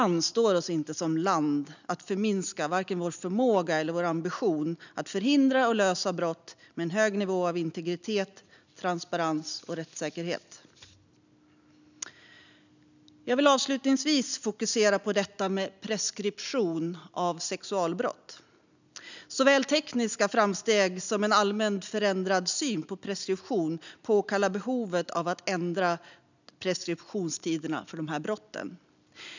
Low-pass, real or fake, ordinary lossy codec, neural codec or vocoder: 7.2 kHz; real; none; none